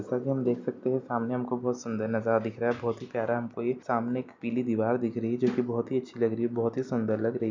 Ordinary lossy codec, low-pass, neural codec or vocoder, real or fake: MP3, 64 kbps; 7.2 kHz; none; real